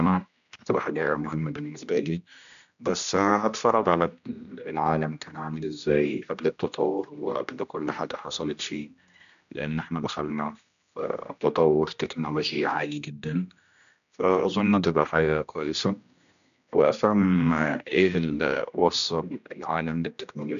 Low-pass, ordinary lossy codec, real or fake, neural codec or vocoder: 7.2 kHz; none; fake; codec, 16 kHz, 1 kbps, X-Codec, HuBERT features, trained on general audio